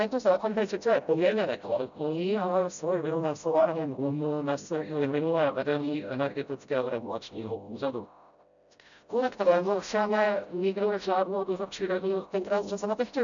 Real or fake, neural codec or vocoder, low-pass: fake; codec, 16 kHz, 0.5 kbps, FreqCodec, smaller model; 7.2 kHz